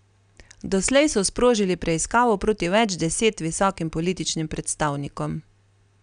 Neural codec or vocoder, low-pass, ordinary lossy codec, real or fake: none; 9.9 kHz; none; real